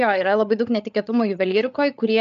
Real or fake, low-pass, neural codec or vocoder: fake; 7.2 kHz; codec, 16 kHz, 8 kbps, FreqCodec, larger model